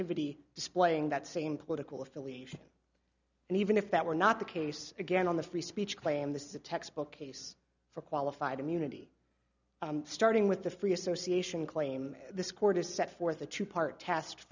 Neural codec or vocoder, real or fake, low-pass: none; real; 7.2 kHz